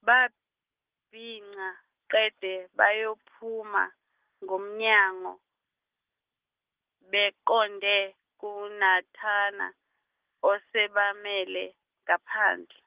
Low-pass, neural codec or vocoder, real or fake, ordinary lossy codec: 3.6 kHz; none; real; Opus, 16 kbps